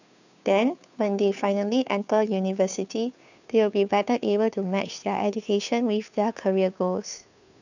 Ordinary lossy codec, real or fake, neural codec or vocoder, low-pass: none; fake; codec, 16 kHz, 2 kbps, FunCodec, trained on Chinese and English, 25 frames a second; 7.2 kHz